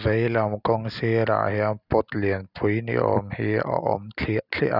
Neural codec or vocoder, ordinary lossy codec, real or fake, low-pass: none; none; real; 5.4 kHz